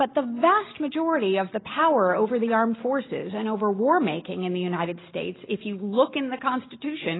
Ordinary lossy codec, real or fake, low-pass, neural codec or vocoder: AAC, 16 kbps; real; 7.2 kHz; none